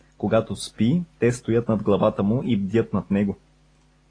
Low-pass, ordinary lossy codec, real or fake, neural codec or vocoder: 9.9 kHz; AAC, 32 kbps; real; none